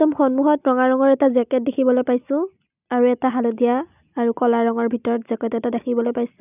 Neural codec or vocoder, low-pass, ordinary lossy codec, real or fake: none; 3.6 kHz; none; real